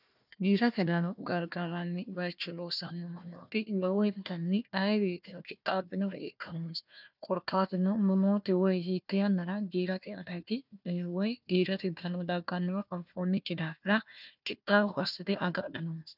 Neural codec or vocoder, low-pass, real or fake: codec, 16 kHz, 1 kbps, FunCodec, trained on Chinese and English, 50 frames a second; 5.4 kHz; fake